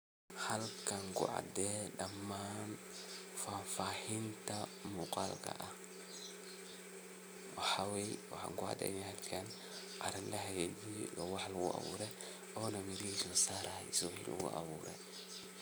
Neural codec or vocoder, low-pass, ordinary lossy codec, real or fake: none; none; none; real